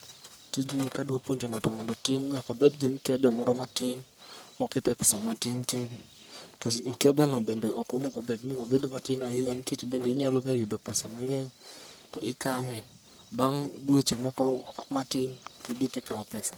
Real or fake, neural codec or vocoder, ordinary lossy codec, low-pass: fake; codec, 44.1 kHz, 1.7 kbps, Pupu-Codec; none; none